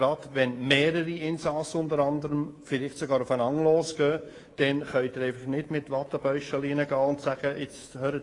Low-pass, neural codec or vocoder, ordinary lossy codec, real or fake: 10.8 kHz; codec, 24 kHz, 3.1 kbps, DualCodec; AAC, 32 kbps; fake